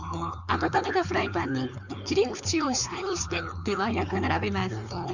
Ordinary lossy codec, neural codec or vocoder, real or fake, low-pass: none; codec, 16 kHz, 4.8 kbps, FACodec; fake; 7.2 kHz